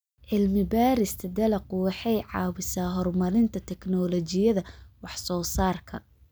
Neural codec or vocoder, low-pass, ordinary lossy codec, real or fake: none; none; none; real